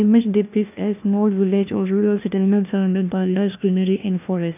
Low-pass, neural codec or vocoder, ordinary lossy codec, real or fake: 3.6 kHz; codec, 16 kHz, 1 kbps, FunCodec, trained on LibriTTS, 50 frames a second; none; fake